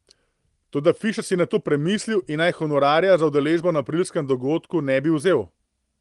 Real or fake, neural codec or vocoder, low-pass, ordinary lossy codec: real; none; 10.8 kHz; Opus, 24 kbps